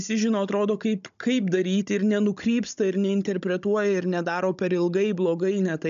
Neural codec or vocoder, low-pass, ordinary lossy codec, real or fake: codec, 16 kHz, 16 kbps, FunCodec, trained on Chinese and English, 50 frames a second; 7.2 kHz; AAC, 96 kbps; fake